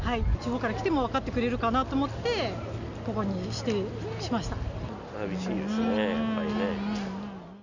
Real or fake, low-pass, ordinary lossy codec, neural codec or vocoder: real; 7.2 kHz; none; none